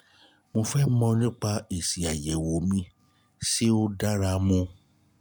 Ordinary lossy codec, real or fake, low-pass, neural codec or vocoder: none; real; none; none